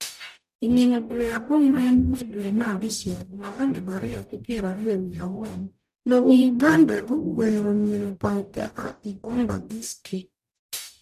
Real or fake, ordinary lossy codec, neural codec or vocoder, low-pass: fake; none; codec, 44.1 kHz, 0.9 kbps, DAC; 14.4 kHz